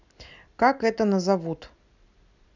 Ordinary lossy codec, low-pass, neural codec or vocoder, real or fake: none; 7.2 kHz; none; real